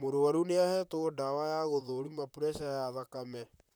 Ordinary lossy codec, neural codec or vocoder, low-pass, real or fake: none; vocoder, 44.1 kHz, 128 mel bands, Pupu-Vocoder; none; fake